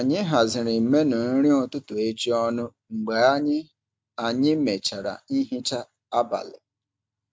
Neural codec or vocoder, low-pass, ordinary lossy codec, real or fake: none; none; none; real